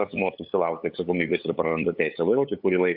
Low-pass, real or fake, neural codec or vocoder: 5.4 kHz; fake; codec, 16 kHz, 8 kbps, FunCodec, trained on LibriTTS, 25 frames a second